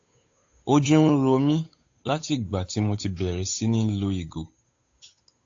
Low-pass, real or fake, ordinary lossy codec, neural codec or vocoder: 7.2 kHz; fake; AAC, 48 kbps; codec, 16 kHz, 8 kbps, FunCodec, trained on Chinese and English, 25 frames a second